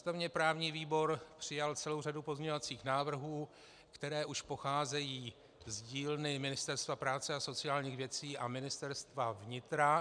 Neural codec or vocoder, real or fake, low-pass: none; real; 9.9 kHz